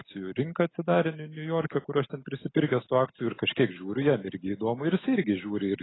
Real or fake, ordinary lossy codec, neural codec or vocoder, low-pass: real; AAC, 16 kbps; none; 7.2 kHz